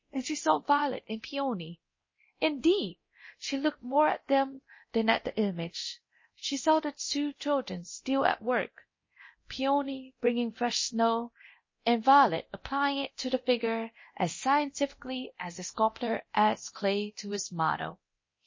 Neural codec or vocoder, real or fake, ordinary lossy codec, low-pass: codec, 24 kHz, 0.9 kbps, DualCodec; fake; MP3, 32 kbps; 7.2 kHz